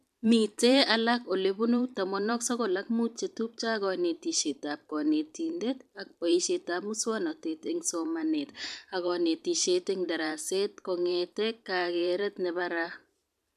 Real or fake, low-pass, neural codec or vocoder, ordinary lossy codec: fake; 14.4 kHz; vocoder, 44.1 kHz, 128 mel bands every 512 samples, BigVGAN v2; AAC, 96 kbps